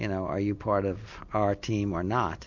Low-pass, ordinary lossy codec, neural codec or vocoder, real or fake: 7.2 kHz; MP3, 48 kbps; none; real